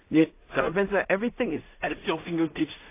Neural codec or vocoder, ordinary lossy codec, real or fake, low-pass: codec, 16 kHz in and 24 kHz out, 0.4 kbps, LongCat-Audio-Codec, two codebook decoder; AAC, 24 kbps; fake; 3.6 kHz